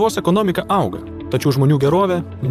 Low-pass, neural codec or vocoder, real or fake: 14.4 kHz; vocoder, 44.1 kHz, 128 mel bands, Pupu-Vocoder; fake